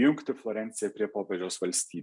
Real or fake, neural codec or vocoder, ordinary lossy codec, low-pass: real; none; MP3, 96 kbps; 10.8 kHz